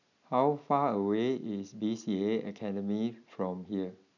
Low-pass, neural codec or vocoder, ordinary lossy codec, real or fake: 7.2 kHz; none; none; real